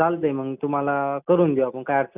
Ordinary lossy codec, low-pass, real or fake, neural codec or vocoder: none; 3.6 kHz; real; none